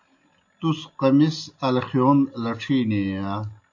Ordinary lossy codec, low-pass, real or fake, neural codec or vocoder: AAC, 48 kbps; 7.2 kHz; real; none